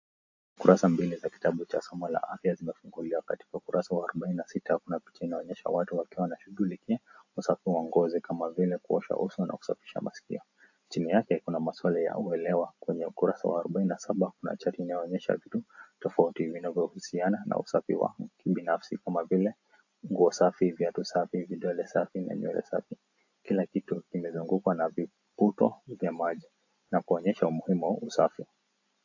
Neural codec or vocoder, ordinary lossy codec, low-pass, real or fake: none; AAC, 48 kbps; 7.2 kHz; real